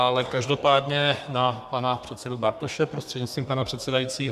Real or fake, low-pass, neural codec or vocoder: fake; 14.4 kHz; codec, 32 kHz, 1.9 kbps, SNAC